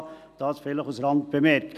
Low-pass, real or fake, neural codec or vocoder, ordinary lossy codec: 14.4 kHz; real; none; none